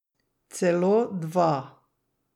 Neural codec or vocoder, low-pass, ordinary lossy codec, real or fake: none; 19.8 kHz; none; real